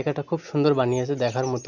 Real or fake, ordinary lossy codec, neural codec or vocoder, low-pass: real; Opus, 64 kbps; none; 7.2 kHz